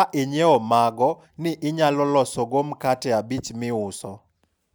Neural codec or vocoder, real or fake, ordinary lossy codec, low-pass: none; real; none; none